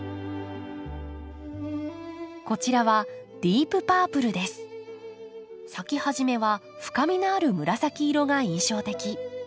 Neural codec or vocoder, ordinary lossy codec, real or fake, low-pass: none; none; real; none